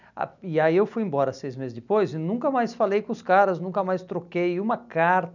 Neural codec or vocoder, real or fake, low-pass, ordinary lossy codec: none; real; 7.2 kHz; none